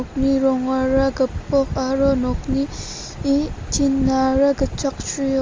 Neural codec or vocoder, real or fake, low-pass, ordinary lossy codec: none; real; 7.2 kHz; Opus, 32 kbps